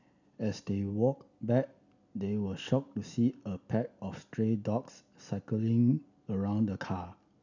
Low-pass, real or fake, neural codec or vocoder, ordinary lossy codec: 7.2 kHz; real; none; none